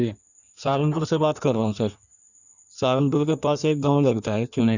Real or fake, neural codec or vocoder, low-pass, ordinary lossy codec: fake; codec, 16 kHz in and 24 kHz out, 1.1 kbps, FireRedTTS-2 codec; 7.2 kHz; none